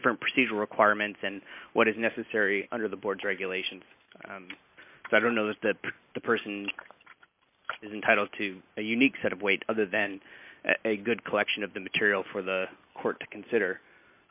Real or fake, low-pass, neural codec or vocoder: real; 3.6 kHz; none